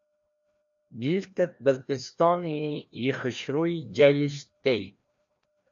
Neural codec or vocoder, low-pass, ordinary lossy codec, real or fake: codec, 16 kHz, 1 kbps, FreqCodec, larger model; 7.2 kHz; AAC, 64 kbps; fake